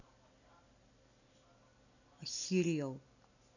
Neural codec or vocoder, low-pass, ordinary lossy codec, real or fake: none; 7.2 kHz; none; real